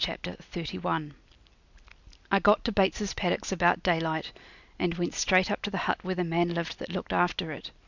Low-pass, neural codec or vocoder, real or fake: 7.2 kHz; none; real